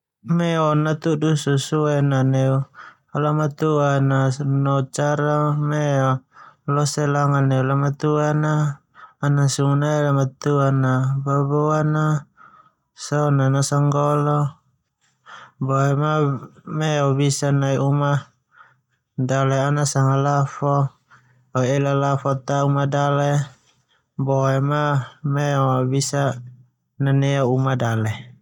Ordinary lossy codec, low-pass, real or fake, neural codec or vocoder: none; 19.8 kHz; real; none